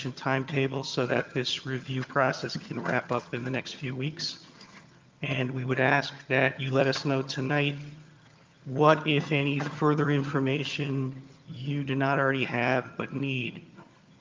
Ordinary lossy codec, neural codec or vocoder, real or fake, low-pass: Opus, 24 kbps; vocoder, 22.05 kHz, 80 mel bands, HiFi-GAN; fake; 7.2 kHz